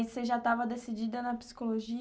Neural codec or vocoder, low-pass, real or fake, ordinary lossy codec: none; none; real; none